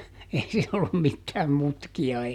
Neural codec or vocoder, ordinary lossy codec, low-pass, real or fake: none; none; 19.8 kHz; real